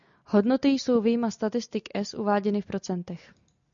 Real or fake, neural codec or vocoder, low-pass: real; none; 7.2 kHz